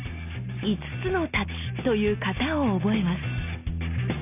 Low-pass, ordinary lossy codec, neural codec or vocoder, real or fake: 3.6 kHz; none; none; real